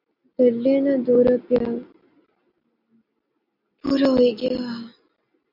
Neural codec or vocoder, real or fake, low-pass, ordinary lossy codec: none; real; 5.4 kHz; MP3, 48 kbps